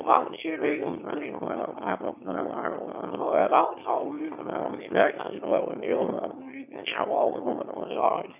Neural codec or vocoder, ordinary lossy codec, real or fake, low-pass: autoencoder, 22.05 kHz, a latent of 192 numbers a frame, VITS, trained on one speaker; none; fake; 3.6 kHz